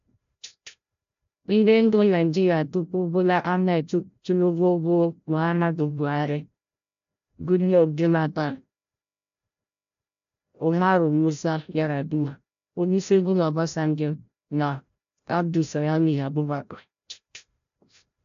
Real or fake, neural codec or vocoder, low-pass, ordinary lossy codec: fake; codec, 16 kHz, 0.5 kbps, FreqCodec, larger model; 7.2 kHz; AAC, 64 kbps